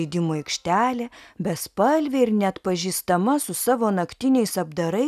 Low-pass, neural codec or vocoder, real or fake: 14.4 kHz; none; real